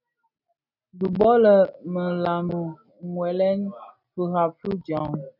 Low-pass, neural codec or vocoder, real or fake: 5.4 kHz; none; real